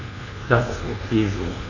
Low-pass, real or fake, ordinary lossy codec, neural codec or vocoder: 7.2 kHz; fake; none; codec, 24 kHz, 1.2 kbps, DualCodec